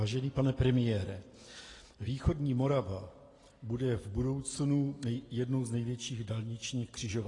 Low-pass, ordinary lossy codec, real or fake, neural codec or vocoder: 10.8 kHz; AAC, 32 kbps; real; none